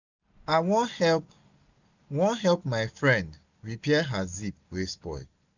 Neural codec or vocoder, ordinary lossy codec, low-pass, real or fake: none; none; 7.2 kHz; real